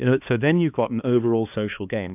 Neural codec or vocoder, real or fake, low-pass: codec, 16 kHz, 2 kbps, X-Codec, HuBERT features, trained on balanced general audio; fake; 3.6 kHz